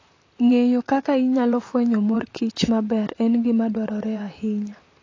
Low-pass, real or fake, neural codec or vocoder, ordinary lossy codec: 7.2 kHz; fake; vocoder, 44.1 kHz, 128 mel bands, Pupu-Vocoder; AAC, 32 kbps